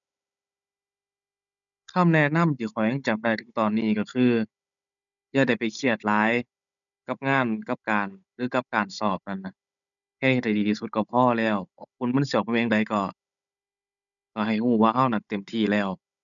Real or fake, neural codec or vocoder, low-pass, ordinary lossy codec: fake; codec, 16 kHz, 16 kbps, FunCodec, trained on Chinese and English, 50 frames a second; 7.2 kHz; none